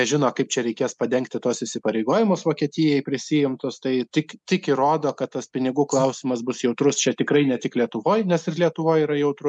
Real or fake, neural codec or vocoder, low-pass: real; none; 10.8 kHz